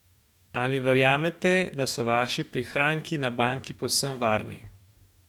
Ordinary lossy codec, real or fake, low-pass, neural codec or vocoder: none; fake; 19.8 kHz; codec, 44.1 kHz, 2.6 kbps, DAC